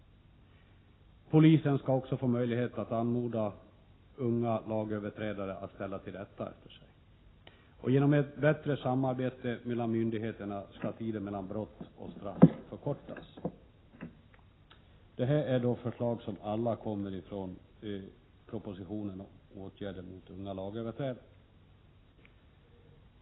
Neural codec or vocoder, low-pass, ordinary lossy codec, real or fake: none; 7.2 kHz; AAC, 16 kbps; real